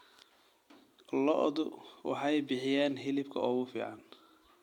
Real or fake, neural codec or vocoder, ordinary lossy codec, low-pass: real; none; MP3, 96 kbps; 19.8 kHz